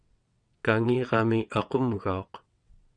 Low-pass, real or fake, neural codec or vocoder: 9.9 kHz; fake; vocoder, 22.05 kHz, 80 mel bands, WaveNeXt